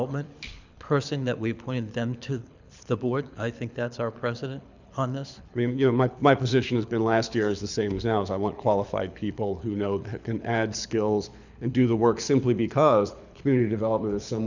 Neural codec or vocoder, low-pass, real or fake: codec, 24 kHz, 6 kbps, HILCodec; 7.2 kHz; fake